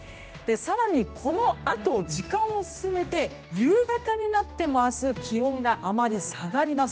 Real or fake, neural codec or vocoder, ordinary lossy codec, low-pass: fake; codec, 16 kHz, 1 kbps, X-Codec, HuBERT features, trained on general audio; none; none